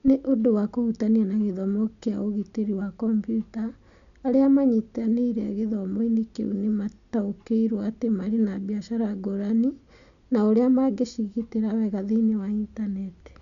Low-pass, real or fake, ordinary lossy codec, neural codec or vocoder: 7.2 kHz; real; none; none